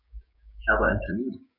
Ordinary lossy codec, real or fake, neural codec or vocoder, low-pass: none; fake; codec, 16 kHz, 6 kbps, DAC; 5.4 kHz